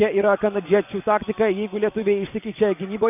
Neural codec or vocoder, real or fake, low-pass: vocoder, 24 kHz, 100 mel bands, Vocos; fake; 3.6 kHz